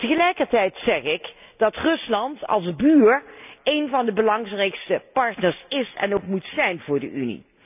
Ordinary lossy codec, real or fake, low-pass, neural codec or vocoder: none; real; 3.6 kHz; none